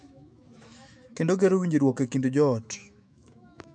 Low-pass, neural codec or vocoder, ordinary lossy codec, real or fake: 9.9 kHz; autoencoder, 48 kHz, 128 numbers a frame, DAC-VAE, trained on Japanese speech; none; fake